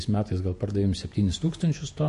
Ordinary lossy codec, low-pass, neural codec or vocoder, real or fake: MP3, 48 kbps; 14.4 kHz; vocoder, 48 kHz, 128 mel bands, Vocos; fake